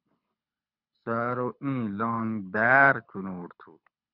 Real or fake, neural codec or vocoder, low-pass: fake; codec, 24 kHz, 6 kbps, HILCodec; 5.4 kHz